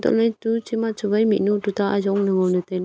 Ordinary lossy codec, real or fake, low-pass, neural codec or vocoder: none; real; none; none